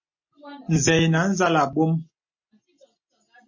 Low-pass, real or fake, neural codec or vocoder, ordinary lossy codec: 7.2 kHz; real; none; MP3, 32 kbps